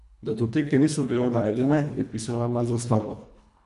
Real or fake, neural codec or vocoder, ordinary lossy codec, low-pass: fake; codec, 24 kHz, 1.5 kbps, HILCodec; none; 10.8 kHz